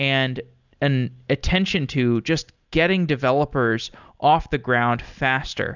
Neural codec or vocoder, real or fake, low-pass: none; real; 7.2 kHz